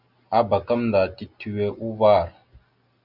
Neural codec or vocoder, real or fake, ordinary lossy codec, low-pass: none; real; Opus, 64 kbps; 5.4 kHz